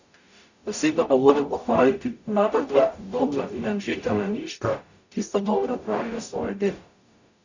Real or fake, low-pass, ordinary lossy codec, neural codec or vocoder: fake; 7.2 kHz; none; codec, 44.1 kHz, 0.9 kbps, DAC